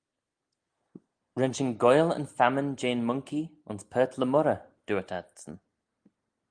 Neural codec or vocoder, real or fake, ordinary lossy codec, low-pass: none; real; Opus, 32 kbps; 9.9 kHz